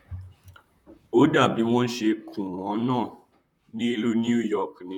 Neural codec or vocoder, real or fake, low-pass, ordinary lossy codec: vocoder, 44.1 kHz, 128 mel bands, Pupu-Vocoder; fake; 19.8 kHz; none